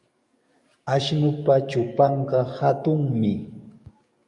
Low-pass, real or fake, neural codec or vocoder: 10.8 kHz; fake; codec, 44.1 kHz, 7.8 kbps, DAC